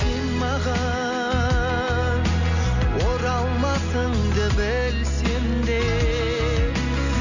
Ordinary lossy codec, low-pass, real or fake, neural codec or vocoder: none; 7.2 kHz; real; none